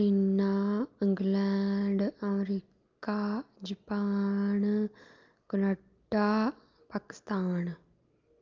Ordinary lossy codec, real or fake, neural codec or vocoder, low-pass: Opus, 24 kbps; real; none; 7.2 kHz